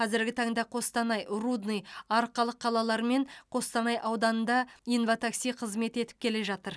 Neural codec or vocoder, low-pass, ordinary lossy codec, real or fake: none; none; none; real